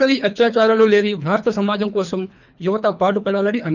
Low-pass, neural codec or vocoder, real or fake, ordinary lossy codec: 7.2 kHz; codec, 24 kHz, 3 kbps, HILCodec; fake; none